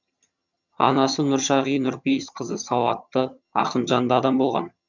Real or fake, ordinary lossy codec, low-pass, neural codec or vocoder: fake; none; 7.2 kHz; vocoder, 22.05 kHz, 80 mel bands, HiFi-GAN